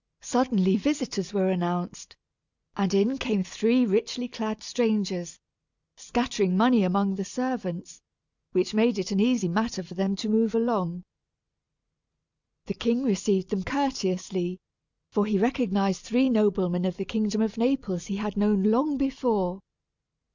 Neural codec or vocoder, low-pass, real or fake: none; 7.2 kHz; real